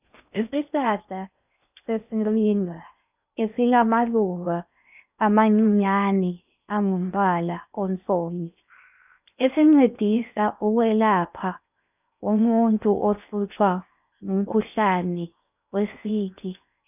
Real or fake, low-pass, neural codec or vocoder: fake; 3.6 kHz; codec, 16 kHz in and 24 kHz out, 0.6 kbps, FocalCodec, streaming, 4096 codes